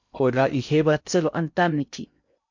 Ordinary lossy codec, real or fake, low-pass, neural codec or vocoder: MP3, 64 kbps; fake; 7.2 kHz; codec, 16 kHz in and 24 kHz out, 0.6 kbps, FocalCodec, streaming, 2048 codes